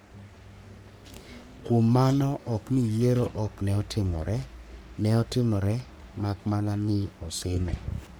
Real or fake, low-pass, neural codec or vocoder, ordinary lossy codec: fake; none; codec, 44.1 kHz, 3.4 kbps, Pupu-Codec; none